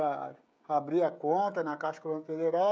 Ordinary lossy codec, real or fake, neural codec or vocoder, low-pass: none; fake; codec, 16 kHz, 16 kbps, FreqCodec, smaller model; none